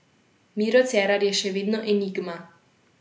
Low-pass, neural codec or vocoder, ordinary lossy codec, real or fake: none; none; none; real